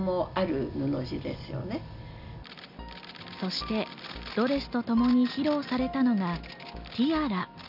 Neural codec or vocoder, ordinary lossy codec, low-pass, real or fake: none; none; 5.4 kHz; real